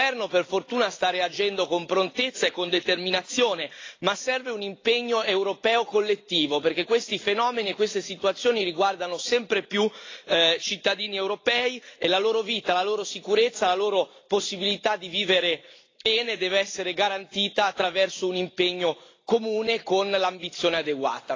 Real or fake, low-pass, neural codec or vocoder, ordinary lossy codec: real; 7.2 kHz; none; AAC, 32 kbps